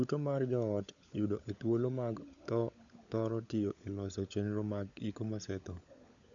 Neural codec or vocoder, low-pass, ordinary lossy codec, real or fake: codec, 16 kHz, 16 kbps, FunCodec, trained on LibriTTS, 50 frames a second; 7.2 kHz; none; fake